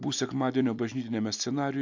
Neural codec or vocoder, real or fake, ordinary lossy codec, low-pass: none; real; MP3, 64 kbps; 7.2 kHz